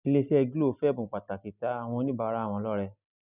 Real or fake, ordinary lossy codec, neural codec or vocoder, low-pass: real; none; none; 3.6 kHz